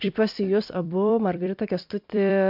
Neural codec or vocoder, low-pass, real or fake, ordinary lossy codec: vocoder, 44.1 kHz, 128 mel bands every 256 samples, BigVGAN v2; 5.4 kHz; fake; MP3, 48 kbps